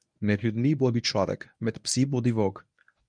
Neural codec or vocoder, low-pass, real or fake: codec, 24 kHz, 0.9 kbps, WavTokenizer, medium speech release version 1; 9.9 kHz; fake